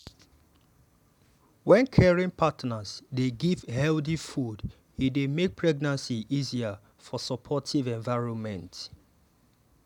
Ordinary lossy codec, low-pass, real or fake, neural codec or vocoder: none; 19.8 kHz; real; none